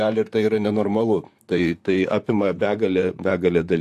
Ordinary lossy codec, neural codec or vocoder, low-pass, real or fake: AAC, 64 kbps; vocoder, 44.1 kHz, 128 mel bands, Pupu-Vocoder; 14.4 kHz; fake